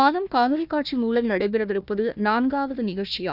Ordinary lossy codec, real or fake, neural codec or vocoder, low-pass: none; fake; codec, 16 kHz, 1 kbps, FunCodec, trained on Chinese and English, 50 frames a second; 5.4 kHz